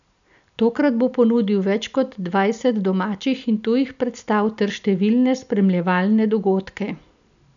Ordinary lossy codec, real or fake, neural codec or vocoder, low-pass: none; real; none; 7.2 kHz